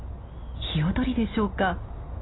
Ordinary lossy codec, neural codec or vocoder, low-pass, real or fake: AAC, 16 kbps; none; 7.2 kHz; real